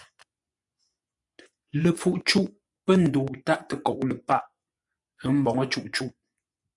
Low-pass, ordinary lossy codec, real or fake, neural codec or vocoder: 10.8 kHz; AAC, 64 kbps; fake; vocoder, 24 kHz, 100 mel bands, Vocos